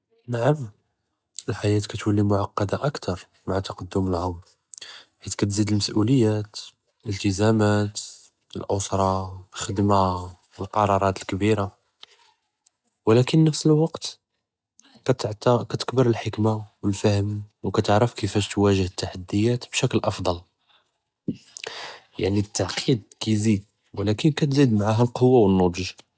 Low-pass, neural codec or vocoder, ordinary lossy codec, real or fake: none; none; none; real